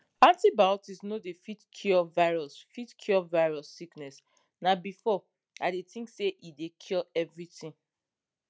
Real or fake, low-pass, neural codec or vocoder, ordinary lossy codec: real; none; none; none